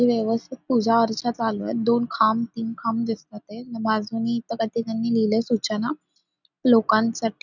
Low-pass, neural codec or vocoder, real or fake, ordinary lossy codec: 7.2 kHz; none; real; none